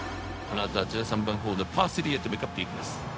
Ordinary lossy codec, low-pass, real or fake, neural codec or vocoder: none; none; fake; codec, 16 kHz, 0.4 kbps, LongCat-Audio-Codec